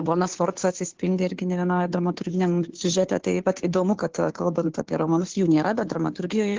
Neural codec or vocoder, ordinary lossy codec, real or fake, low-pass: codec, 16 kHz, 2 kbps, FunCodec, trained on Chinese and English, 25 frames a second; Opus, 16 kbps; fake; 7.2 kHz